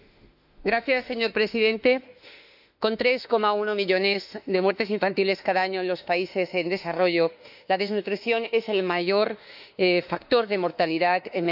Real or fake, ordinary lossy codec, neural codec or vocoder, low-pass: fake; none; autoencoder, 48 kHz, 32 numbers a frame, DAC-VAE, trained on Japanese speech; 5.4 kHz